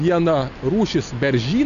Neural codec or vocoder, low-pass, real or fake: none; 7.2 kHz; real